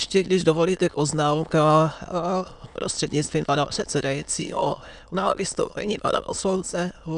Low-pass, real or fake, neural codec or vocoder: 9.9 kHz; fake; autoencoder, 22.05 kHz, a latent of 192 numbers a frame, VITS, trained on many speakers